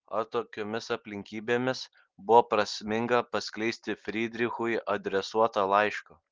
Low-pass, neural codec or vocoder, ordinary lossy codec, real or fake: 7.2 kHz; none; Opus, 16 kbps; real